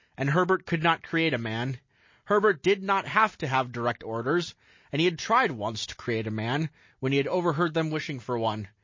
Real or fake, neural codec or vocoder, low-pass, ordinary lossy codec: real; none; 7.2 kHz; MP3, 32 kbps